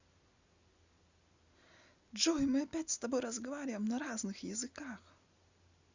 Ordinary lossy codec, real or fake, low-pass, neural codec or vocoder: Opus, 64 kbps; real; 7.2 kHz; none